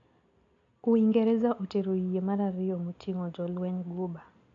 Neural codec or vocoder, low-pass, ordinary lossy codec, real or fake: none; 7.2 kHz; none; real